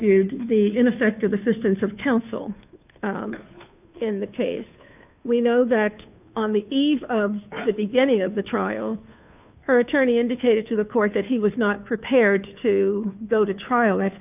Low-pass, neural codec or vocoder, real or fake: 3.6 kHz; codec, 16 kHz, 2 kbps, FunCodec, trained on Chinese and English, 25 frames a second; fake